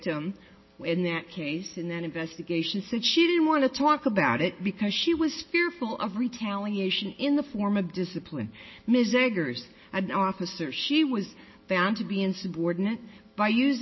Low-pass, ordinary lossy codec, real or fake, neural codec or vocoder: 7.2 kHz; MP3, 24 kbps; real; none